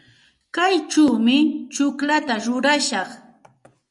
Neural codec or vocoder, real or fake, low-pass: vocoder, 24 kHz, 100 mel bands, Vocos; fake; 10.8 kHz